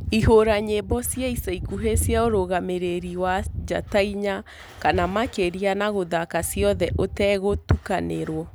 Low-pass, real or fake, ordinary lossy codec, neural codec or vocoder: none; real; none; none